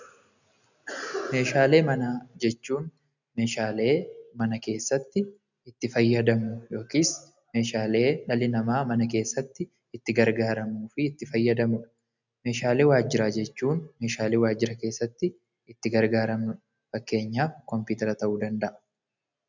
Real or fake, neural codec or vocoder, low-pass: real; none; 7.2 kHz